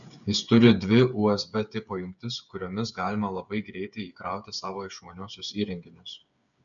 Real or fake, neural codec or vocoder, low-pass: fake; codec, 16 kHz, 8 kbps, FreqCodec, smaller model; 7.2 kHz